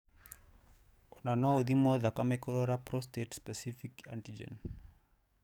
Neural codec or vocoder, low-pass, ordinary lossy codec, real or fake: codec, 44.1 kHz, 7.8 kbps, DAC; 19.8 kHz; none; fake